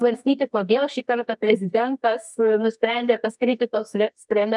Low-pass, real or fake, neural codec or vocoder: 10.8 kHz; fake; codec, 24 kHz, 0.9 kbps, WavTokenizer, medium music audio release